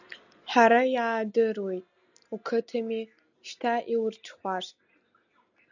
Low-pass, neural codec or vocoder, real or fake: 7.2 kHz; none; real